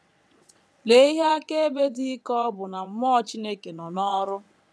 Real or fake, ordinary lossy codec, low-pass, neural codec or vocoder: fake; none; none; vocoder, 22.05 kHz, 80 mel bands, WaveNeXt